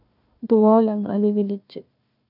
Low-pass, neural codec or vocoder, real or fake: 5.4 kHz; codec, 16 kHz, 1 kbps, FunCodec, trained on Chinese and English, 50 frames a second; fake